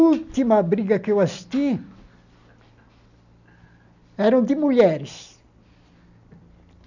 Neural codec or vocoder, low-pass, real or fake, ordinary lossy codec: none; 7.2 kHz; real; none